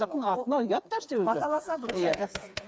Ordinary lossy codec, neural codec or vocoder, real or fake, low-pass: none; codec, 16 kHz, 4 kbps, FreqCodec, smaller model; fake; none